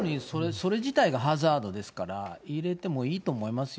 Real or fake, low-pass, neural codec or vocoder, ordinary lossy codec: real; none; none; none